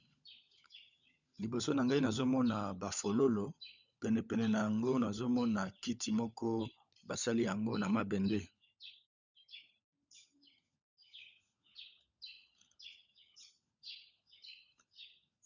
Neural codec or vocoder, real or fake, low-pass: codec, 16 kHz, 16 kbps, FunCodec, trained on LibriTTS, 50 frames a second; fake; 7.2 kHz